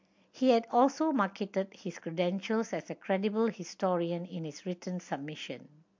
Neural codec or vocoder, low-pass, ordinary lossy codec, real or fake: none; 7.2 kHz; MP3, 48 kbps; real